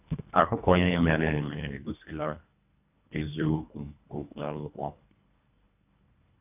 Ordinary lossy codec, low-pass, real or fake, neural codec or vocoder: none; 3.6 kHz; fake; codec, 24 kHz, 1.5 kbps, HILCodec